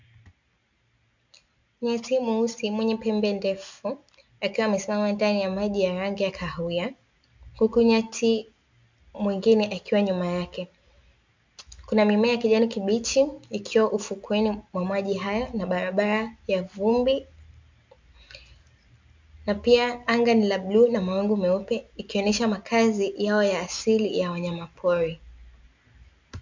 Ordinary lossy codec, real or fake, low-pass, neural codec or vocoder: MP3, 64 kbps; real; 7.2 kHz; none